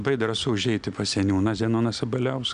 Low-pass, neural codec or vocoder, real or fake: 9.9 kHz; none; real